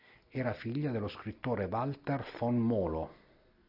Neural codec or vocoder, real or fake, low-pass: none; real; 5.4 kHz